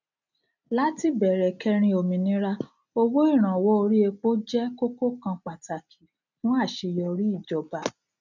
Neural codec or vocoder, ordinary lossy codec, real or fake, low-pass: none; none; real; 7.2 kHz